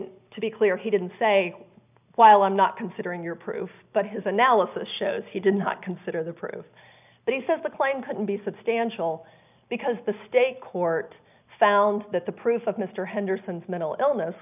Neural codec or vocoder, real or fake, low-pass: none; real; 3.6 kHz